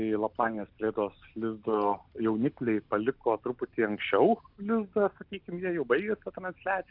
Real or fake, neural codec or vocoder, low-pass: real; none; 5.4 kHz